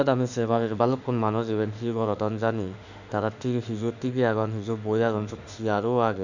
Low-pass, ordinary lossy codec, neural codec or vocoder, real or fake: 7.2 kHz; none; autoencoder, 48 kHz, 32 numbers a frame, DAC-VAE, trained on Japanese speech; fake